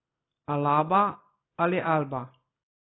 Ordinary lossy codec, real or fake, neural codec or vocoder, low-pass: AAC, 16 kbps; real; none; 7.2 kHz